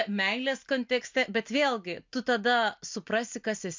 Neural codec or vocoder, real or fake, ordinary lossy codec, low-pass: none; real; MP3, 64 kbps; 7.2 kHz